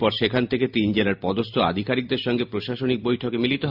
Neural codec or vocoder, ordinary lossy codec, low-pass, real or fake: none; MP3, 48 kbps; 5.4 kHz; real